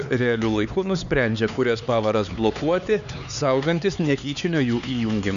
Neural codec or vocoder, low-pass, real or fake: codec, 16 kHz, 4 kbps, X-Codec, HuBERT features, trained on LibriSpeech; 7.2 kHz; fake